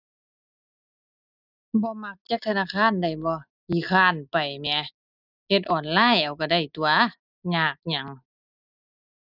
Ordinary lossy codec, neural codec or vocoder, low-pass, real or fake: none; none; 5.4 kHz; real